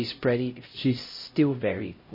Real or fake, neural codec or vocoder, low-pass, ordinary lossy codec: fake; codec, 16 kHz, 0.5 kbps, X-Codec, HuBERT features, trained on LibriSpeech; 5.4 kHz; MP3, 24 kbps